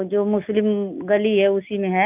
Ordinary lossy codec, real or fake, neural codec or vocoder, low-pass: none; real; none; 3.6 kHz